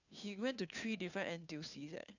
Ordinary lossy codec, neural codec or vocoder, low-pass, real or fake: none; codec, 16 kHz, 2 kbps, FunCodec, trained on Chinese and English, 25 frames a second; 7.2 kHz; fake